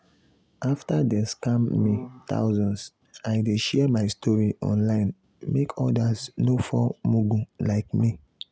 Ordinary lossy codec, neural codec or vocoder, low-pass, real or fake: none; none; none; real